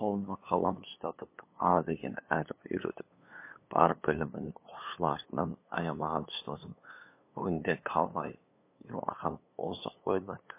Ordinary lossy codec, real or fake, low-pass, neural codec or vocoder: MP3, 24 kbps; fake; 3.6 kHz; codec, 16 kHz, 2 kbps, FunCodec, trained on LibriTTS, 25 frames a second